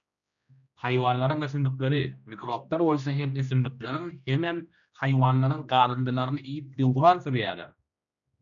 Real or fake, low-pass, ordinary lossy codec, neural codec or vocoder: fake; 7.2 kHz; MP3, 96 kbps; codec, 16 kHz, 1 kbps, X-Codec, HuBERT features, trained on general audio